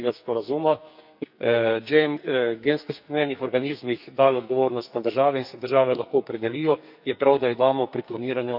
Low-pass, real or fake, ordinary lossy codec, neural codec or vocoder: 5.4 kHz; fake; none; codec, 44.1 kHz, 2.6 kbps, SNAC